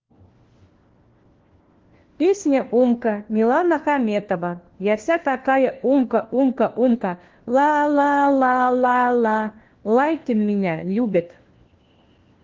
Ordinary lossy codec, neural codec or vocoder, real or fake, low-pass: Opus, 16 kbps; codec, 16 kHz, 1 kbps, FunCodec, trained on LibriTTS, 50 frames a second; fake; 7.2 kHz